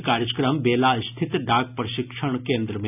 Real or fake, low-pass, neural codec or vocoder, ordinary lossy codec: real; 3.6 kHz; none; none